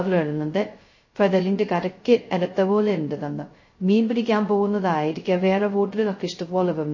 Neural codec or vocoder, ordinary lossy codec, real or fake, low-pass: codec, 16 kHz, 0.2 kbps, FocalCodec; MP3, 32 kbps; fake; 7.2 kHz